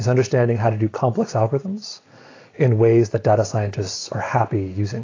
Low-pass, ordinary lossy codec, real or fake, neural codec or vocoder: 7.2 kHz; AAC, 32 kbps; real; none